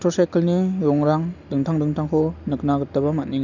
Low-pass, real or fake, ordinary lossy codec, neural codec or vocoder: 7.2 kHz; real; none; none